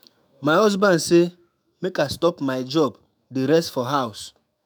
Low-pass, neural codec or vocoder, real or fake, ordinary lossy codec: none; autoencoder, 48 kHz, 128 numbers a frame, DAC-VAE, trained on Japanese speech; fake; none